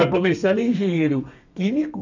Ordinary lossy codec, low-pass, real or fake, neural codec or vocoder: none; 7.2 kHz; fake; codec, 32 kHz, 1.9 kbps, SNAC